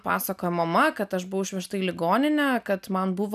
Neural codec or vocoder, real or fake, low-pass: none; real; 14.4 kHz